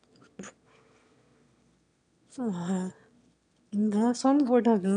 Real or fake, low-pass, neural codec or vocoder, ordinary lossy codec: fake; 9.9 kHz; autoencoder, 22.05 kHz, a latent of 192 numbers a frame, VITS, trained on one speaker; none